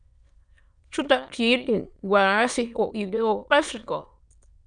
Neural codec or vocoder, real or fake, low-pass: autoencoder, 22.05 kHz, a latent of 192 numbers a frame, VITS, trained on many speakers; fake; 9.9 kHz